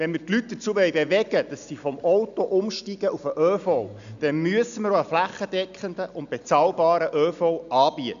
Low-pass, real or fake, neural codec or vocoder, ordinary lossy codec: 7.2 kHz; real; none; none